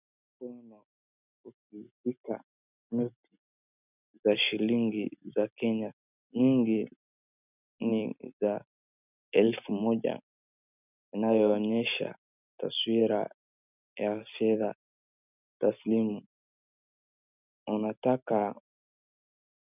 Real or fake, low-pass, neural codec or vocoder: real; 3.6 kHz; none